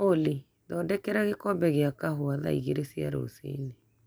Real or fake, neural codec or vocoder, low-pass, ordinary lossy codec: real; none; none; none